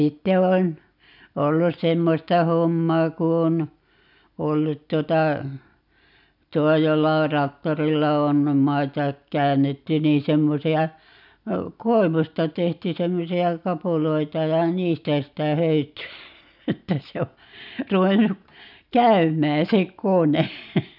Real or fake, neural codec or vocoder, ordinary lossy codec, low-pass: real; none; none; 5.4 kHz